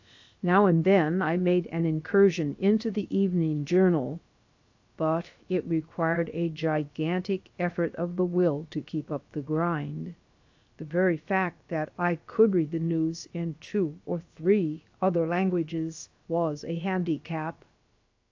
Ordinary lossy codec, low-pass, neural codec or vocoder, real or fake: AAC, 48 kbps; 7.2 kHz; codec, 16 kHz, about 1 kbps, DyCAST, with the encoder's durations; fake